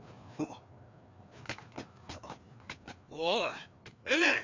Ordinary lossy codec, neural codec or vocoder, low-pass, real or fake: MP3, 64 kbps; codec, 16 kHz, 2 kbps, FreqCodec, larger model; 7.2 kHz; fake